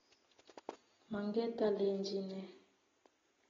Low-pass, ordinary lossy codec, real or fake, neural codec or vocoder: 7.2 kHz; AAC, 24 kbps; real; none